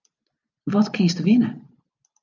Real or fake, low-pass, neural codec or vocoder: real; 7.2 kHz; none